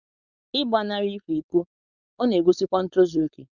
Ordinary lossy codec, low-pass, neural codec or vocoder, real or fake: none; 7.2 kHz; codec, 16 kHz, 4.8 kbps, FACodec; fake